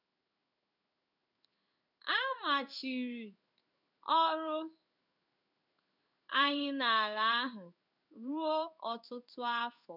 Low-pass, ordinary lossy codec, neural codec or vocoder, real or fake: 5.4 kHz; none; codec, 16 kHz in and 24 kHz out, 1 kbps, XY-Tokenizer; fake